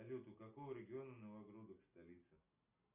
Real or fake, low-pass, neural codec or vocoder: real; 3.6 kHz; none